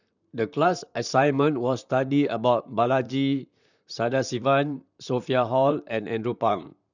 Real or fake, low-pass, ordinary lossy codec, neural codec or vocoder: fake; 7.2 kHz; none; vocoder, 44.1 kHz, 128 mel bands, Pupu-Vocoder